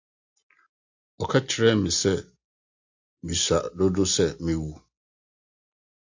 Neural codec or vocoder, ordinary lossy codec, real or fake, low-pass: none; AAC, 48 kbps; real; 7.2 kHz